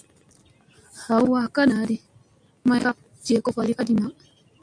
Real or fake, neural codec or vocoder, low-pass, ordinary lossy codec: real; none; 9.9 kHz; MP3, 96 kbps